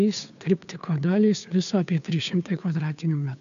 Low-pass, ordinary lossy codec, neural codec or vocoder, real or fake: 7.2 kHz; AAC, 96 kbps; codec, 16 kHz, 2 kbps, FunCodec, trained on Chinese and English, 25 frames a second; fake